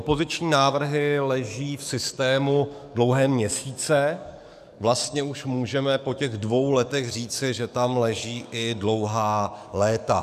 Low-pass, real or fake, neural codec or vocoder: 14.4 kHz; fake; codec, 44.1 kHz, 7.8 kbps, DAC